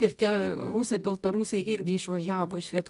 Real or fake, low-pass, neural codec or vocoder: fake; 10.8 kHz; codec, 24 kHz, 0.9 kbps, WavTokenizer, medium music audio release